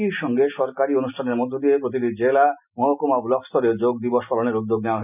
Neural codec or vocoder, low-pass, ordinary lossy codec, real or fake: none; 3.6 kHz; none; real